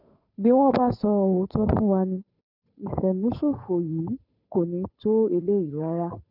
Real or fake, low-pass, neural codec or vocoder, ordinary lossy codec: fake; 5.4 kHz; codec, 16 kHz, 8 kbps, FunCodec, trained on Chinese and English, 25 frames a second; none